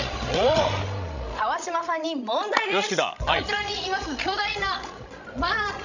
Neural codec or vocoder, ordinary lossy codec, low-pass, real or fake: codec, 16 kHz, 16 kbps, FreqCodec, larger model; none; 7.2 kHz; fake